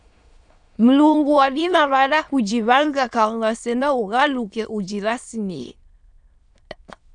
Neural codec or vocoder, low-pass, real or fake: autoencoder, 22.05 kHz, a latent of 192 numbers a frame, VITS, trained on many speakers; 9.9 kHz; fake